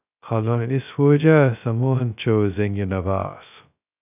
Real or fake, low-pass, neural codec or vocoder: fake; 3.6 kHz; codec, 16 kHz, 0.2 kbps, FocalCodec